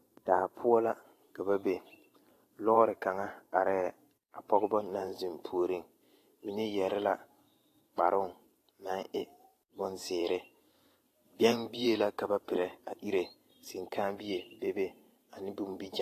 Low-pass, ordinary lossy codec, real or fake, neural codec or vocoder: 14.4 kHz; AAC, 48 kbps; fake; vocoder, 44.1 kHz, 128 mel bands every 512 samples, BigVGAN v2